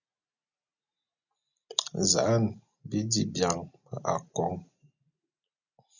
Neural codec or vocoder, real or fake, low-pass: none; real; 7.2 kHz